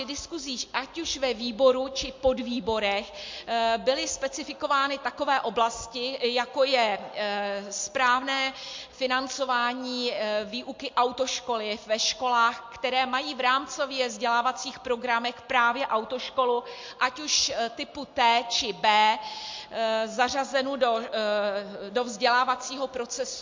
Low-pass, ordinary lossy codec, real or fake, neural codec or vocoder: 7.2 kHz; MP3, 48 kbps; real; none